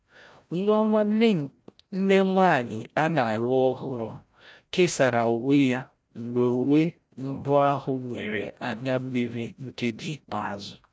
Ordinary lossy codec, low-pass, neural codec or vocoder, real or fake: none; none; codec, 16 kHz, 0.5 kbps, FreqCodec, larger model; fake